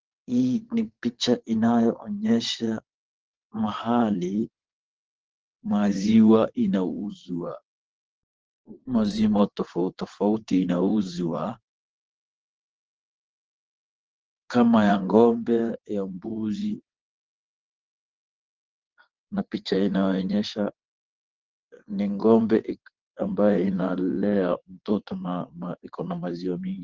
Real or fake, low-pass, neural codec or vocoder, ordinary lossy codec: fake; 7.2 kHz; vocoder, 22.05 kHz, 80 mel bands, WaveNeXt; Opus, 16 kbps